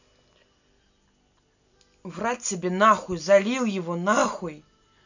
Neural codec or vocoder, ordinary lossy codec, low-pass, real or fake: none; none; 7.2 kHz; real